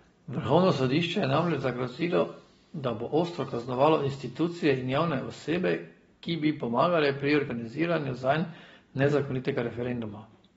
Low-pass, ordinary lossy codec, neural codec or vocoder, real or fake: 19.8 kHz; AAC, 24 kbps; none; real